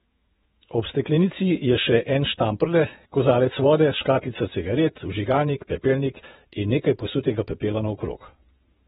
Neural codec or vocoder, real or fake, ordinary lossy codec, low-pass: vocoder, 48 kHz, 128 mel bands, Vocos; fake; AAC, 16 kbps; 19.8 kHz